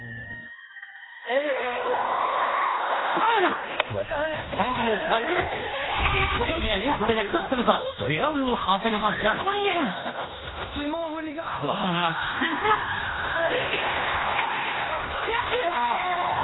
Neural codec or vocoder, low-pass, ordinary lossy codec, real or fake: codec, 16 kHz in and 24 kHz out, 0.9 kbps, LongCat-Audio-Codec, fine tuned four codebook decoder; 7.2 kHz; AAC, 16 kbps; fake